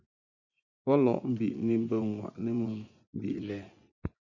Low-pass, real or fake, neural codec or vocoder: 7.2 kHz; fake; vocoder, 24 kHz, 100 mel bands, Vocos